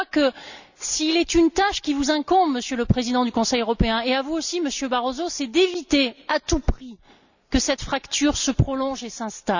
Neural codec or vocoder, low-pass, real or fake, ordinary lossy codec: none; 7.2 kHz; real; none